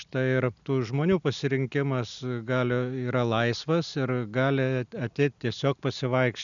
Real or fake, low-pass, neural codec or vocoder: real; 7.2 kHz; none